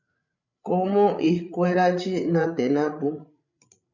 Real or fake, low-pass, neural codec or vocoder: fake; 7.2 kHz; codec, 16 kHz, 8 kbps, FreqCodec, larger model